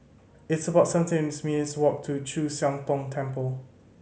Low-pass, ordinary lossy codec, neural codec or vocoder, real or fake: none; none; none; real